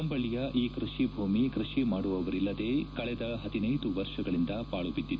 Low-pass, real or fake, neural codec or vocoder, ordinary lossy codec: none; real; none; none